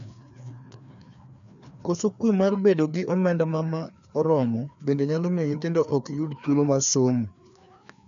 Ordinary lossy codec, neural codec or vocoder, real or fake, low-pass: none; codec, 16 kHz, 2 kbps, FreqCodec, larger model; fake; 7.2 kHz